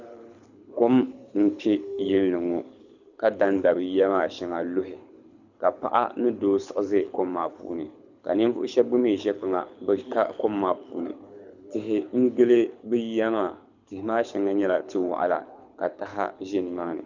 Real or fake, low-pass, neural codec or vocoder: fake; 7.2 kHz; codec, 24 kHz, 6 kbps, HILCodec